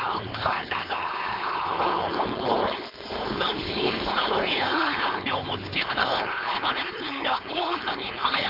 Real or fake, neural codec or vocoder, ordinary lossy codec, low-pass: fake; codec, 16 kHz, 4.8 kbps, FACodec; MP3, 48 kbps; 5.4 kHz